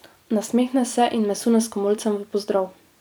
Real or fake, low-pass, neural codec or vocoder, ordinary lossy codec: fake; none; vocoder, 44.1 kHz, 128 mel bands every 256 samples, BigVGAN v2; none